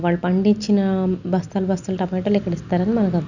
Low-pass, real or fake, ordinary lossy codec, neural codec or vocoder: 7.2 kHz; real; none; none